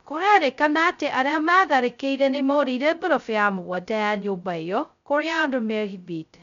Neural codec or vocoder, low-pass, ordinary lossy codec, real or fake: codec, 16 kHz, 0.2 kbps, FocalCodec; 7.2 kHz; none; fake